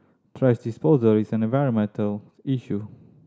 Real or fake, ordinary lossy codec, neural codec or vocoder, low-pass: real; none; none; none